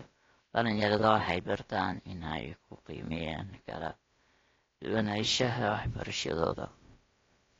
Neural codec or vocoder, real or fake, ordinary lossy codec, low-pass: codec, 16 kHz, about 1 kbps, DyCAST, with the encoder's durations; fake; AAC, 32 kbps; 7.2 kHz